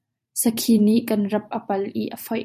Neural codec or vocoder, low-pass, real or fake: vocoder, 44.1 kHz, 128 mel bands every 512 samples, BigVGAN v2; 14.4 kHz; fake